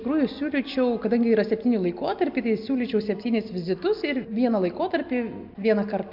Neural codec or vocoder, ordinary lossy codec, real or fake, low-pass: none; MP3, 48 kbps; real; 5.4 kHz